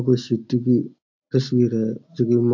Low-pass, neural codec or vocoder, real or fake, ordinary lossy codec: 7.2 kHz; none; real; AAC, 48 kbps